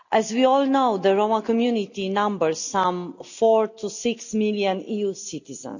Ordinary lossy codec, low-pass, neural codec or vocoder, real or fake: none; 7.2 kHz; none; real